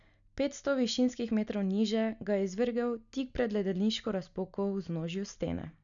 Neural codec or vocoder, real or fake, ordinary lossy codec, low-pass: none; real; none; 7.2 kHz